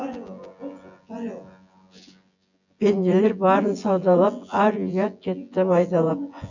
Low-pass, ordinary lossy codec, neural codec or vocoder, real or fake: 7.2 kHz; none; vocoder, 24 kHz, 100 mel bands, Vocos; fake